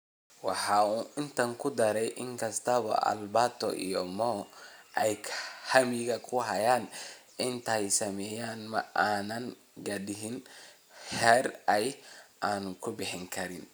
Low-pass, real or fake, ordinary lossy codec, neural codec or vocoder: none; real; none; none